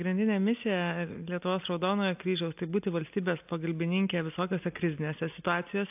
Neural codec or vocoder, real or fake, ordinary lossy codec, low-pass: none; real; AAC, 32 kbps; 3.6 kHz